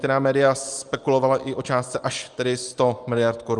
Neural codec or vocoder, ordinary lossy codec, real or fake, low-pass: none; Opus, 32 kbps; real; 10.8 kHz